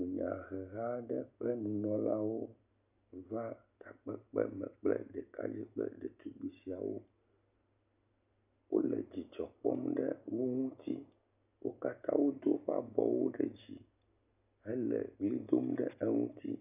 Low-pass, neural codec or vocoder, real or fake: 3.6 kHz; none; real